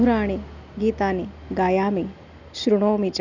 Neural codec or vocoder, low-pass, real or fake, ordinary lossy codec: none; 7.2 kHz; real; none